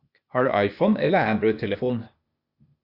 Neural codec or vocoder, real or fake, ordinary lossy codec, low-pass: codec, 16 kHz, 0.8 kbps, ZipCodec; fake; Opus, 64 kbps; 5.4 kHz